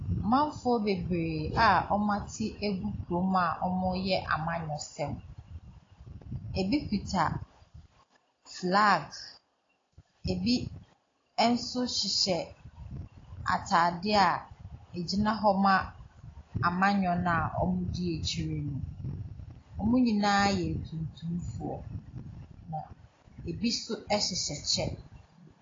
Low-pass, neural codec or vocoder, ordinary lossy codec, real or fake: 7.2 kHz; none; AAC, 32 kbps; real